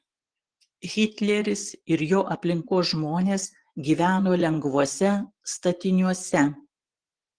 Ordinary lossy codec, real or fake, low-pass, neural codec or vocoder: Opus, 16 kbps; fake; 9.9 kHz; vocoder, 24 kHz, 100 mel bands, Vocos